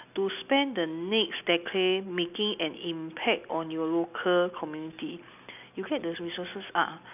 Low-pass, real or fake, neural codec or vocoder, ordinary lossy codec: 3.6 kHz; real; none; none